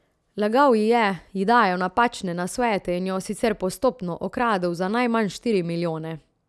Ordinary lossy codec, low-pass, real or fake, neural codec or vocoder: none; none; real; none